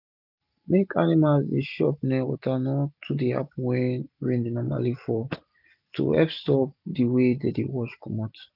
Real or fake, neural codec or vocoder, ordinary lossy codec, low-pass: real; none; none; 5.4 kHz